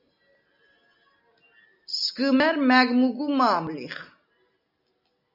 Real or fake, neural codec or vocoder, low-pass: real; none; 5.4 kHz